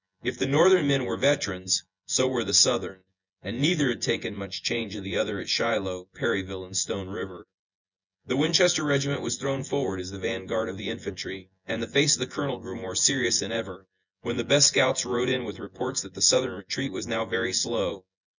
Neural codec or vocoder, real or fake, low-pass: vocoder, 24 kHz, 100 mel bands, Vocos; fake; 7.2 kHz